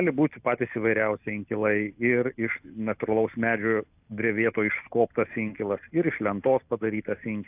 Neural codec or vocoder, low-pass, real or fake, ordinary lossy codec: none; 3.6 kHz; real; AAC, 32 kbps